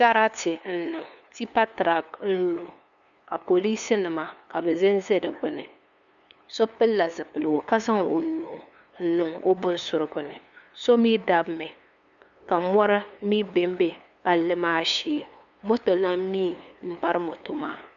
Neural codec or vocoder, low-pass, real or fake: codec, 16 kHz, 2 kbps, FunCodec, trained on LibriTTS, 25 frames a second; 7.2 kHz; fake